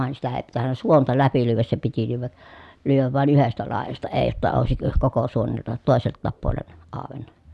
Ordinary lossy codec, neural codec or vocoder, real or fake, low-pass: none; none; real; none